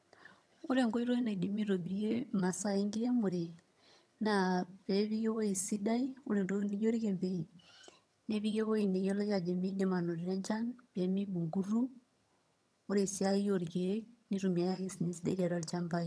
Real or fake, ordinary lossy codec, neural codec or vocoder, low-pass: fake; none; vocoder, 22.05 kHz, 80 mel bands, HiFi-GAN; none